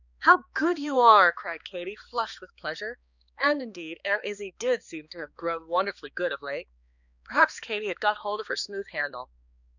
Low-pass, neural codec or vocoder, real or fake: 7.2 kHz; codec, 16 kHz, 2 kbps, X-Codec, HuBERT features, trained on balanced general audio; fake